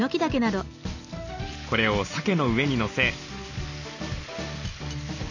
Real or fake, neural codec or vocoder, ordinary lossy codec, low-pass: real; none; none; 7.2 kHz